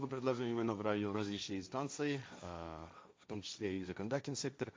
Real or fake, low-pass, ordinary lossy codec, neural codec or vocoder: fake; none; none; codec, 16 kHz, 1.1 kbps, Voila-Tokenizer